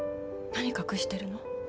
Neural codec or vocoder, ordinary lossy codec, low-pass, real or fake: none; none; none; real